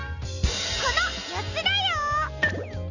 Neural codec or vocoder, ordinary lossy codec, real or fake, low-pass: none; none; real; 7.2 kHz